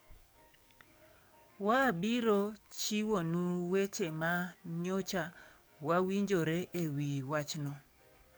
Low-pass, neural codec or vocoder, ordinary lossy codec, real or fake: none; codec, 44.1 kHz, 7.8 kbps, DAC; none; fake